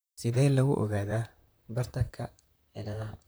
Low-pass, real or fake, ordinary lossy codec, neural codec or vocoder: none; fake; none; vocoder, 44.1 kHz, 128 mel bands, Pupu-Vocoder